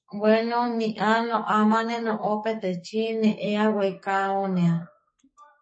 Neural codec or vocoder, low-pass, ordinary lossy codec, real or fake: codec, 44.1 kHz, 2.6 kbps, SNAC; 9.9 kHz; MP3, 32 kbps; fake